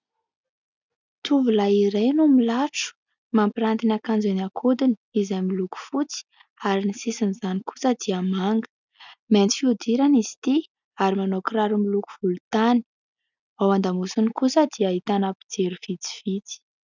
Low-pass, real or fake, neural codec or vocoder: 7.2 kHz; real; none